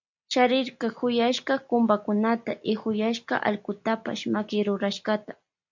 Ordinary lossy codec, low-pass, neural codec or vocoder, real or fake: MP3, 64 kbps; 7.2 kHz; none; real